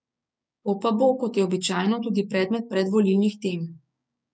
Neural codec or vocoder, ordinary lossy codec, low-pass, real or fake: codec, 16 kHz, 6 kbps, DAC; none; none; fake